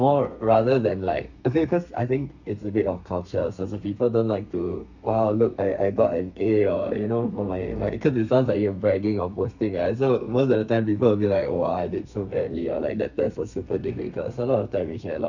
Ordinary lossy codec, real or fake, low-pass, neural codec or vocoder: none; fake; 7.2 kHz; codec, 32 kHz, 1.9 kbps, SNAC